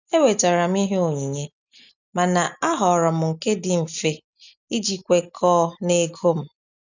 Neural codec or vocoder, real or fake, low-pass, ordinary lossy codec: none; real; 7.2 kHz; none